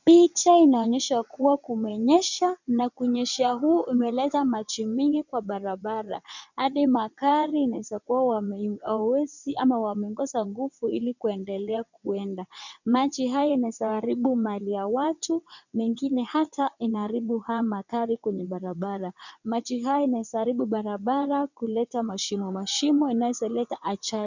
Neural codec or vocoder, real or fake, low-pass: vocoder, 22.05 kHz, 80 mel bands, WaveNeXt; fake; 7.2 kHz